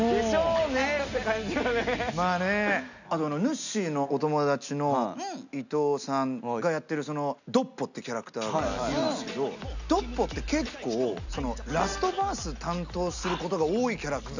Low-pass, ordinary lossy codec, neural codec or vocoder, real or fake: 7.2 kHz; none; none; real